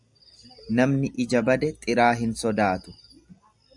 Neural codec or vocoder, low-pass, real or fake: none; 10.8 kHz; real